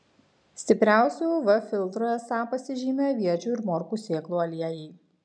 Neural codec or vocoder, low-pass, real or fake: none; 9.9 kHz; real